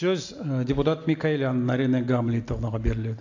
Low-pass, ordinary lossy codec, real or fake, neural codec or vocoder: 7.2 kHz; AAC, 48 kbps; real; none